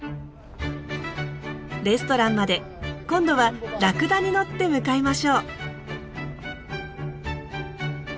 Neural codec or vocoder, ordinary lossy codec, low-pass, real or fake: none; none; none; real